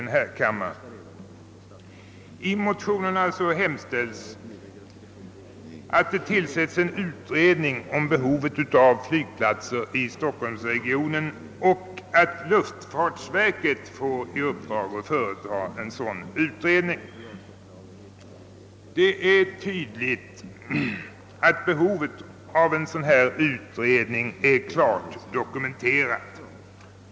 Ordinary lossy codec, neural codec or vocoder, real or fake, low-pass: none; none; real; none